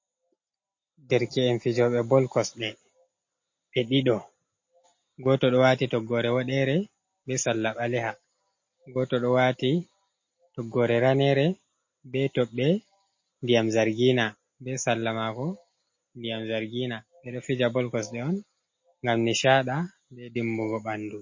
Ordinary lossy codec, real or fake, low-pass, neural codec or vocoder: MP3, 32 kbps; real; 7.2 kHz; none